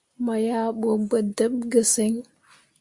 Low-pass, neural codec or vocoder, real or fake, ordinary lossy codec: 10.8 kHz; none; real; Opus, 64 kbps